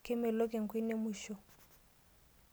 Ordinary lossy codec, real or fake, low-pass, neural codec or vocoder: none; real; none; none